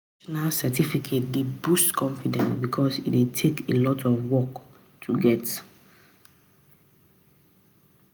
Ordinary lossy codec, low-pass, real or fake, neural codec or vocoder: none; none; real; none